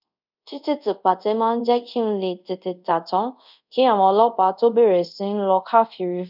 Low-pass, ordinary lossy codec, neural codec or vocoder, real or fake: 5.4 kHz; none; codec, 24 kHz, 0.5 kbps, DualCodec; fake